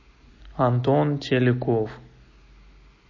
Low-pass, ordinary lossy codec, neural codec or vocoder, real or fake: 7.2 kHz; MP3, 32 kbps; none; real